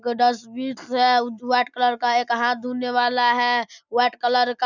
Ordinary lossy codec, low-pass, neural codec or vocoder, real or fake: none; 7.2 kHz; none; real